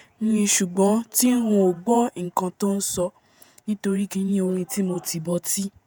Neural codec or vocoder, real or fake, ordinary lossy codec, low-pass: vocoder, 48 kHz, 128 mel bands, Vocos; fake; none; none